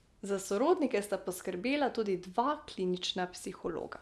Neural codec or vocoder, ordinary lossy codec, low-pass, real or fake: none; none; none; real